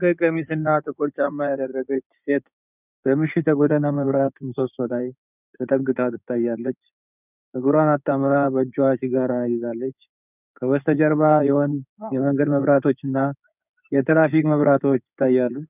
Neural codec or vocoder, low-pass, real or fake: codec, 16 kHz in and 24 kHz out, 2.2 kbps, FireRedTTS-2 codec; 3.6 kHz; fake